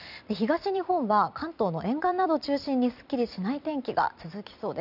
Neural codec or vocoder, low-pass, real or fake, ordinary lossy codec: vocoder, 44.1 kHz, 128 mel bands every 256 samples, BigVGAN v2; 5.4 kHz; fake; none